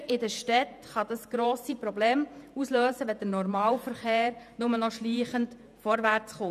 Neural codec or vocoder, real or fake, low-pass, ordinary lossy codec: vocoder, 48 kHz, 128 mel bands, Vocos; fake; 14.4 kHz; none